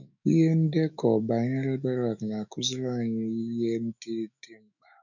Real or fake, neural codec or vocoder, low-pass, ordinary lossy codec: fake; autoencoder, 48 kHz, 128 numbers a frame, DAC-VAE, trained on Japanese speech; 7.2 kHz; none